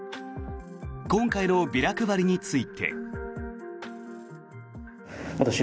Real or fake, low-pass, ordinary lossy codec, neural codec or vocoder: real; none; none; none